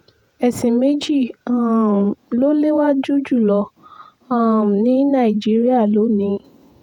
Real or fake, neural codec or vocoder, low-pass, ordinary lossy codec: fake; vocoder, 48 kHz, 128 mel bands, Vocos; 19.8 kHz; none